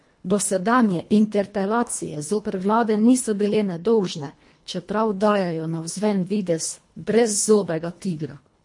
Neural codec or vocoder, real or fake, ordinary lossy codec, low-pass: codec, 24 kHz, 1.5 kbps, HILCodec; fake; MP3, 48 kbps; 10.8 kHz